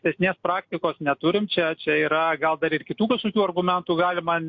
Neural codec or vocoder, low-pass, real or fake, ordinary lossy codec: none; 7.2 kHz; real; MP3, 64 kbps